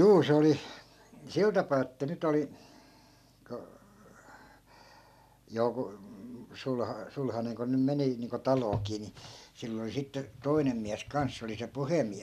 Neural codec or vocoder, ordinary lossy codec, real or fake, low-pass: none; none; real; 14.4 kHz